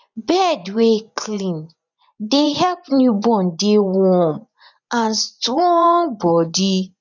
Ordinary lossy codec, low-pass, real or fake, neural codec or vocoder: none; 7.2 kHz; fake; vocoder, 24 kHz, 100 mel bands, Vocos